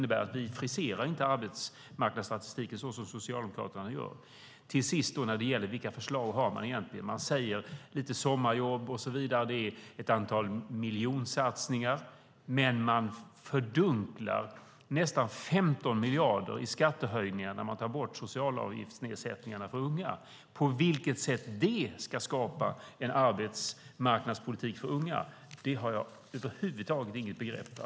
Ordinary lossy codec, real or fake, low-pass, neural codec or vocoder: none; real; none; none